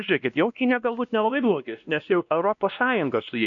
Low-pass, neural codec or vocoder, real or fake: 7.2 kHz; codec, 16 kHz, 1 kbps, X-Codec, WavLM features, trained on Multilingual LibriSpeech; fake